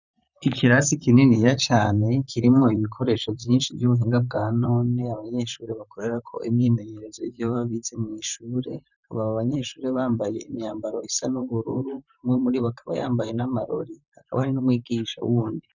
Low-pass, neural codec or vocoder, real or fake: 7.2 kHz; vocoder, 44.1 kHz, 128 mel bands, Pupu-Vocoder; fake